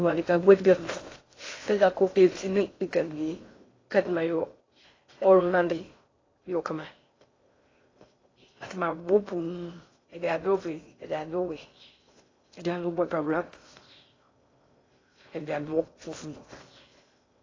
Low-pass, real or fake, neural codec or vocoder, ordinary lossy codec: 7.2 kHz; fake; codec, 16 kHz in and 24 kHz out, 0.6 kbps, FocalCodec, streaming, 2048 codes; AAC, 32 kbps